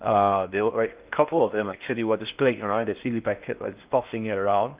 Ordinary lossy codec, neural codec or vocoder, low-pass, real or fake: Opus, 32 kbps; codec, 16 kHz in and 24 kHz out, 0.6 kbps, FocalCodec, streaming, 4096 codes; 3.6 kHz; fake